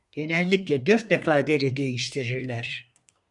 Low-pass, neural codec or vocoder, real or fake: 10.8 kHz; codec, 24 kHz, 1 kbps, SNAC; fake